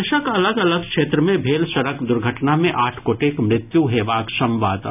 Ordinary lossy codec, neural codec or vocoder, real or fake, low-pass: none; none; real; 3.6 kHz